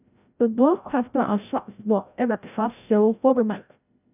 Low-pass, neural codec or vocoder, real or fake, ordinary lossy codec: 3.6 kHz; codec, 16 kHz, 0.5 kbps, FreqCodec, larger model; fake; none